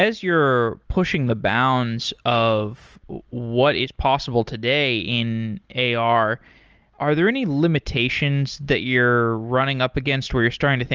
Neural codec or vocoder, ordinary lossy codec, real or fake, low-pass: none; Opus, 24 kbps; real; 7.2 kHz